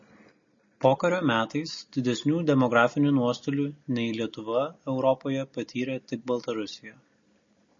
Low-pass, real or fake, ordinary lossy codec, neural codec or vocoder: 7.2 kHz; real; MP3, 32 kbps; none